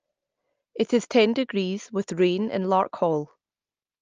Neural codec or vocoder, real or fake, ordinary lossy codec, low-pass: none; real; Opus, 24 kbps; 7.2 kHz